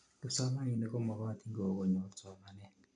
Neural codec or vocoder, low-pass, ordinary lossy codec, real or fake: none; 9.9 kHz; none; real